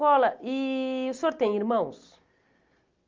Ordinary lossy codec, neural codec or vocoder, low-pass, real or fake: Opus, 24 kbps; none; 7.2 kHz; real